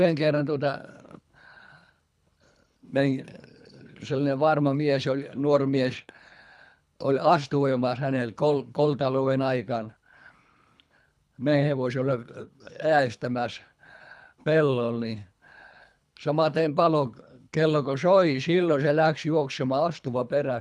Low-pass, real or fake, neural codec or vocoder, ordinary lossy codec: none; fake; codec, 24 kHz, 3 kbps, HILCodec; none